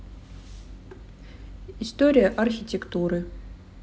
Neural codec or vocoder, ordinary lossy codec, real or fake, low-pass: none; none; real; none